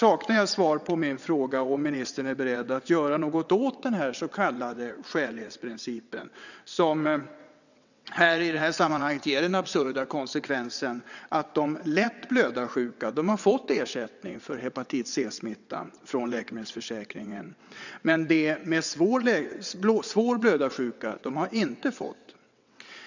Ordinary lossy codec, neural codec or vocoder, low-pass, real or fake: none; vocoder, 22.05 kHz, 80 mel bands, WaveNeXt; 7.2 kHz; fake